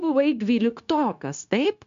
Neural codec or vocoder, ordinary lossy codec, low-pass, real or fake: codec, 16 kHz, 0.9 kbps, LongCat-Audio-Codec; MP3, 64 kbps; 7.2 kHz; fake